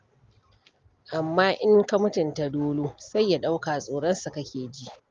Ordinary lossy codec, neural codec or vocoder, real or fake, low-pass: Opus, 32 kbps; none; real; 7.2 kHz